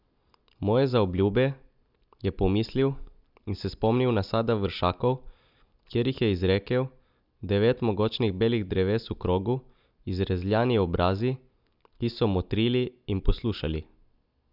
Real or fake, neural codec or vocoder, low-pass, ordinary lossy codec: real; none; 5.4 kHz; none